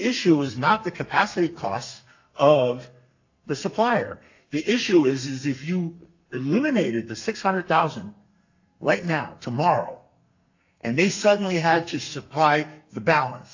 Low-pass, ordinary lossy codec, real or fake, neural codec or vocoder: 7.2 kHz; AAC, 48 kbps; fake; codec, 32 kHz, 1.9 kbps, SNAC